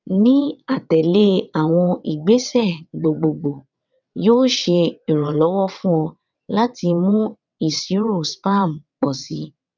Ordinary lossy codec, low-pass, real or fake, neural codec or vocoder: none; 7.2 kHz; fake; vocoder, 22.05 kHz, 80 mel bands, WaveNeXt